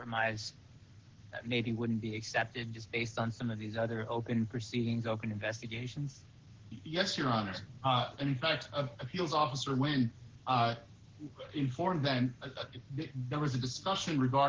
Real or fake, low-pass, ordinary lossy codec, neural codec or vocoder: fake; 7.2 kHz; Opus, 16 kbps; codec, 44.1 kHz, 7.8 kbps, Pupu-Codec